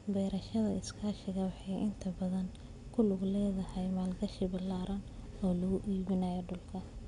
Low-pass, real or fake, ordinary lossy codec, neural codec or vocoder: 10.8 kHz; real; none; none